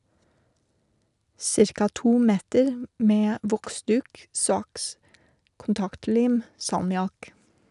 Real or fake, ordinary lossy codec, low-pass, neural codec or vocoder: real; none; 10.8 kHz; none